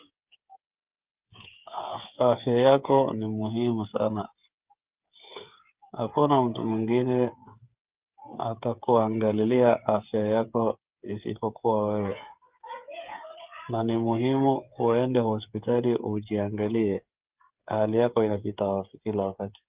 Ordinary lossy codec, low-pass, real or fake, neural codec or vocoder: Opus, 32 kbps; 3.6 kHz; fake; codec, 16 kHz, 8 kbps, FreqCodec, smaller model